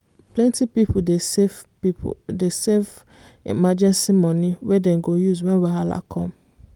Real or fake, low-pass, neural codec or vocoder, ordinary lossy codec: real; 19.8 kHz; none; Opus, 32 kbps